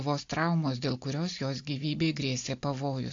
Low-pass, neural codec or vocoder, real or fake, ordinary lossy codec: 7.2 kHz; none; real; AAC, 48 kbps